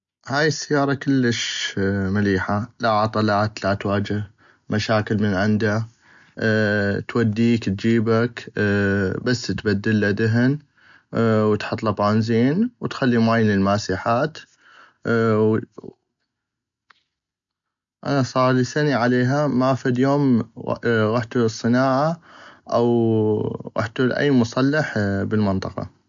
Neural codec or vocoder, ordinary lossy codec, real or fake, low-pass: none; MP3, 64 kbps; real; 7.2 kHz